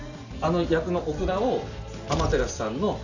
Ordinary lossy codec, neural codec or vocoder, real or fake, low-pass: none; none; real; 7.2 kHz